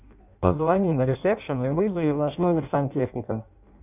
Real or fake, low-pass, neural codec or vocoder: fake; 3.6 kHz; codec, 16 kHz in and 24 kHz out, 0.6 kbps, FireRedTTS-2 codec